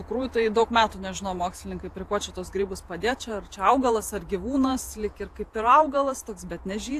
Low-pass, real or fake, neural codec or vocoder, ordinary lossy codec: 14.4 kHz; fake; vocoder, 48 kHz, 128 mel bands, Vocos; AAC, 64 kbps